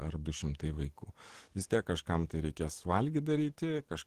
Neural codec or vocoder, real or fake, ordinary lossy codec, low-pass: codec, 44.1 kHz, 7.8 kbps, DAC; fake; Opus, 16 kbps; 14.4 kHz